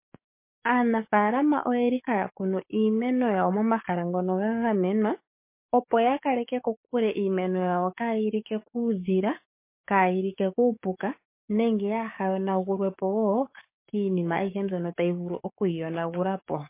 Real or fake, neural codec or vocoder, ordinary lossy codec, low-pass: fake; codec, 44.1 kHz, 7.8 kbps, DAC; MP3, 24 kbps; 3.6 kHz